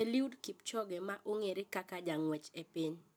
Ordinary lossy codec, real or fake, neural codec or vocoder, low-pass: none; real; none; none